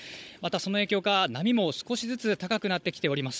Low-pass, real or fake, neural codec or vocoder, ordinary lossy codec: none; fake; codec, 16 kHz, 16 kbps, FunCodec, trained on Chinese and English, 50 frames a second; none